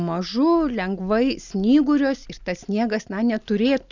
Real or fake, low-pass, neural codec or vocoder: real; 7.2 kHz; none